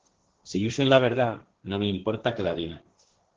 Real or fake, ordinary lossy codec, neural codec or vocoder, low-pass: fake; Opus, 16 kbps; codec, 16 kHz, 1.1 kbps, Voila-Tokenizer; 7.2 kHz